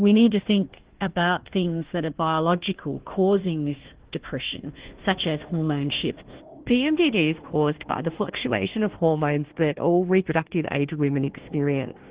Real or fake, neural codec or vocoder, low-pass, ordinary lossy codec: fake; codec, 16 kHz, 1 kbps, FunCodec, trained on Chinese and English, 50 frames a second; 3.6 kHz; Opus, 16 kbps